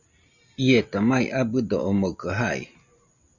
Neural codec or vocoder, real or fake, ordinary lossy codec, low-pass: none; real; Opus, 64 kbps; 7.2 kHz